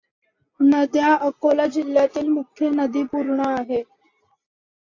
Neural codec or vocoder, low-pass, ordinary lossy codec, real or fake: none; 7.2 kHz; AAC, 48 kbps; real